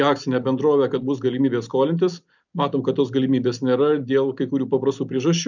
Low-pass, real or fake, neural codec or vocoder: 7.2 kHz; real; none